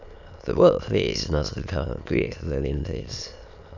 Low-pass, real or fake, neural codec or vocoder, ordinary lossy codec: 7.2 kHz; fake; autoencoder, 22.05 kHz, a latent of 192 numbers a frame, VITS, trained on many speakers; none